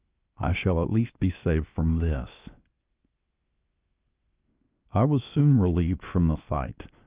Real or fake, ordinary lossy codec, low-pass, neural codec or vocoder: fake; Opus, 24 kbps; 3.6 kHz; codec, 16 kHz, 0.7 kbps, FocalCodec